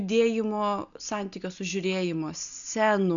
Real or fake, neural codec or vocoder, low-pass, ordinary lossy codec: real; none; 7.2 kHz; MP3, 96 kbps